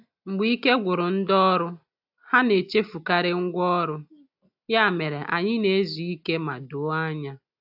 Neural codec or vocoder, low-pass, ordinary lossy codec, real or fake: none; 5.4 kHz; AAC, 48 kbps; real